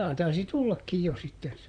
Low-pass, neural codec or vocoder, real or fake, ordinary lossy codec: 9.9 kHz; vocoder, 44.1 kHz, 128 mel bands, Pupu-Vocoder; fake; none